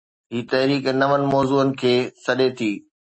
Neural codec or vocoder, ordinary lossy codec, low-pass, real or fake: none; MP3, 32 kbps; 9.9 kHz; real